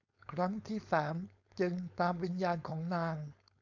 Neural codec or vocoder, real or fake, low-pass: codec, 16 kHz, 4.8 kbps, FACodec; fake; 7.2 kHz